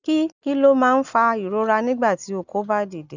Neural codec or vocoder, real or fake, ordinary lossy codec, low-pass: none; real; none; 7.2 kHz